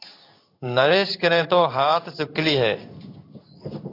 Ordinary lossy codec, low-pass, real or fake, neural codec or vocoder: AAC, 32 kbps; 5.4 kHz; fake; codec, 16 kHz in and 24 kHz out, 1 kbps, XY-Tokenizer